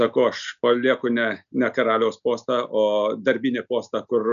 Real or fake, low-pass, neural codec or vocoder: real; 7.2 kHz; none